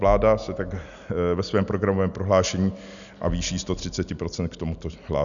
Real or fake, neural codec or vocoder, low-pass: real; none; 7.2 kHz